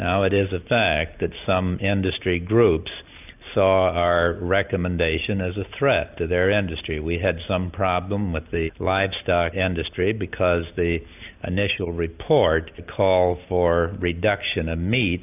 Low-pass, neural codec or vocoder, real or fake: 3.6 kHz; none; real